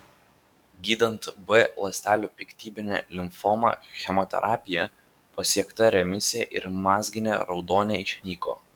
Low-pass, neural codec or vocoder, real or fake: 19.8 kHz; codec, 44.1 kHz, 7.8 kbps, DAC; fake